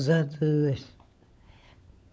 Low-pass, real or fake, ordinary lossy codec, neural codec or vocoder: none; fake; none; codec, 16 kHz, 16 kbps, FunCodec, trained on LibriTTS, 50 frames a second